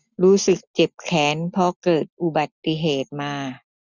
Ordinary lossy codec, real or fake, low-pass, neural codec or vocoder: none; real; 7.2 kHz; none